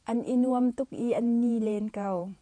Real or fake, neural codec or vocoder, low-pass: fake; vocoder, 48 kHz, 128 mel bands, Vocos; 9.9 kHz